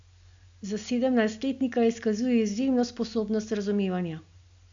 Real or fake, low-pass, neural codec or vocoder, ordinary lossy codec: real; 7.2 kHz; none; AAC, 64 kbps